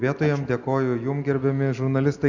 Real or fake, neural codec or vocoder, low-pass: real; none; 7.2 kHz